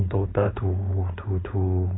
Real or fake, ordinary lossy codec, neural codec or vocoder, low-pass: fake; AAC, 16 kbps; codec, 16 kHz, 4.8 kbps, FACodec; 7.2 kHz